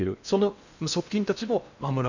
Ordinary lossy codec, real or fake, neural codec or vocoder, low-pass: none; fake; codec, 16 kHz in and 24 kHz out, 0.8 kbps, FocalCodec, streaming, 65536 codes; 7.2 kHz